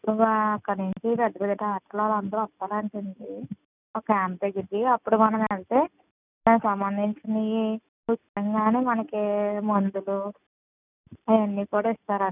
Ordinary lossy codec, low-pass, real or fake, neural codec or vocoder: none; 3.6 kHz; real; none